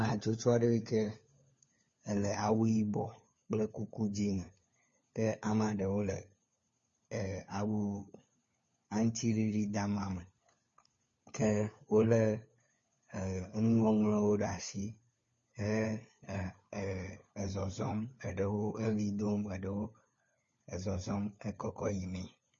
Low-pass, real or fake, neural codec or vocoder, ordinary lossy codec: 7.2 kHz; fake; codec, 16 kHz, 4 kbps, FunCodec, trained on LibriTTS, 50 frames a second; MP3, 32 kbps